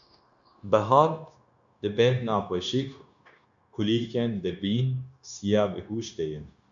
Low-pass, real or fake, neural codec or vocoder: 7.2 kHz; fake; codec, 16 kHz, 0.9 kbps, LongCat-Audio-Codec